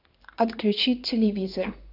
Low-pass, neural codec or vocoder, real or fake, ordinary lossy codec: 5.4 kHz; codec, 16 kHz in and 24 kHz out, 1 kbps, XY-Tokenizer; fake; none